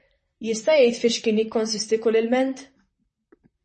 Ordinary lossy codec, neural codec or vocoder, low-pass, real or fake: MP3, 32 kbps; vocoder, 44.1 kHz, 128 mel bands, Pupu-Vocoder; 10.8 kHz; fake